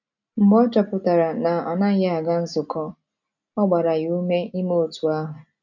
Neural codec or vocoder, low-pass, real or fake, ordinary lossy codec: none; 7.2 kHz; real; none